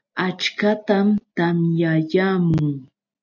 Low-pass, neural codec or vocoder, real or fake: 7.2 kHz; none; real